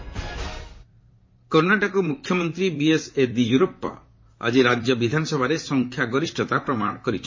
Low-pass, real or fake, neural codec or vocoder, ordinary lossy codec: 7.2 kHz; fake; vocoder, 44.1 kHz, 128 mel bands, Pupu-Vocoder; MP3, 32 kbps